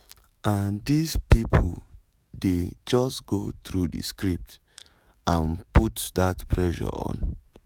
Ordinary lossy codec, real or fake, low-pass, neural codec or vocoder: none; fake; 19.8 kHz; codec, 44.1 kHz, 7.8 kbps, DAC